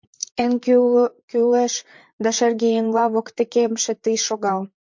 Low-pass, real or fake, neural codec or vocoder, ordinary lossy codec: 7.2 kHz; fake; vocoder, 44.1 kHz, 128 mel bands, Pupu-Vocoder; MP3, 48 kbps